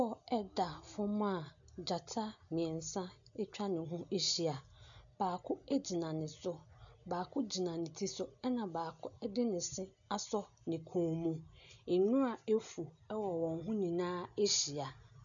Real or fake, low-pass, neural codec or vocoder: real; 7.2 kHz; none